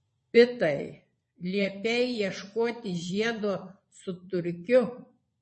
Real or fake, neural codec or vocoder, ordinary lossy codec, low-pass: fake; vocoder, 22.05 kHz, 80 mel bands, Vocos; MP3, 32 kbps; 9.9 kHz